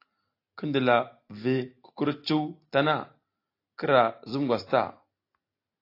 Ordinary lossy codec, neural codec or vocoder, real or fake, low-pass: AAC, 32 kbps; none; real; 5.4 kHz